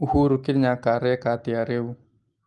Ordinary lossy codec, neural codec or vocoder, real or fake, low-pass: Opus, 32 kbps; none; real; 10.8 kHz